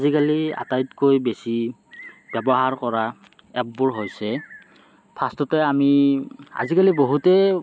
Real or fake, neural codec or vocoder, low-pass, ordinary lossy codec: real; none; none; none